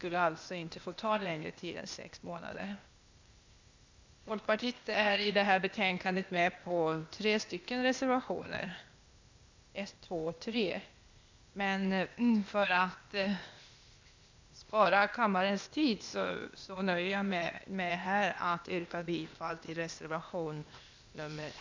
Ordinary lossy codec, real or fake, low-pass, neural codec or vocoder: MP3, 64 kbps; fake; 7.2 kHz; codec, 16 kHz, 0.8 kbps, ZipCodec